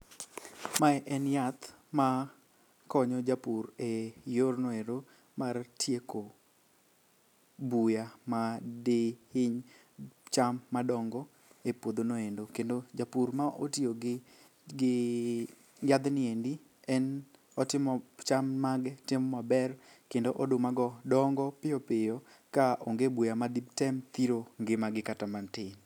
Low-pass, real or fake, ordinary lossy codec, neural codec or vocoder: 19.8 kHz; real; none; none